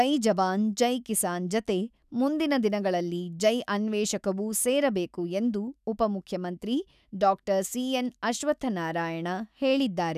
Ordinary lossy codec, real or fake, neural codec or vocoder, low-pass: none; fake; autoencoder, 48 kHz, 128 numbers a frame, DAC-VAE, trained on Japanese speech; 14.4 kHz